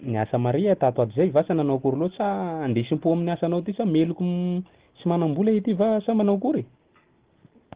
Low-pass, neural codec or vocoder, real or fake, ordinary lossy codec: 3.6 kHz; none; real; Opus, 16 kbps